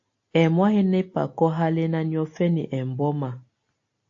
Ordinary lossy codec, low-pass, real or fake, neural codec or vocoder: AAC, 48 kbps; 7.2 kHz; real; none